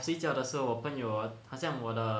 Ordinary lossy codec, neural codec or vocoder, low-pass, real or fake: none; none; none; real